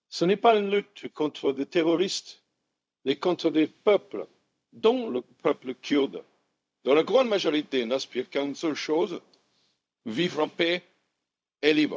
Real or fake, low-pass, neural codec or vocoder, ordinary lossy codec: fake; none; codec, 16 kHz, 0.4 kbps, LongCat-Audio-Codec; none